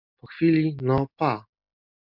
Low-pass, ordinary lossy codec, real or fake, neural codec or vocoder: 5.4 kHz; MP3, 48 kbps; real; none